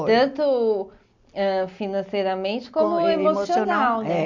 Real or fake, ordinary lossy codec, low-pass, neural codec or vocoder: real; MP3, 64 kbps; 7.2 kHz; none